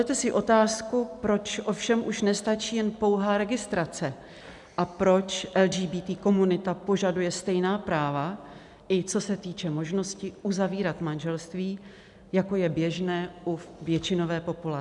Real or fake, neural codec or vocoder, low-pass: real; none; 10.8 kHz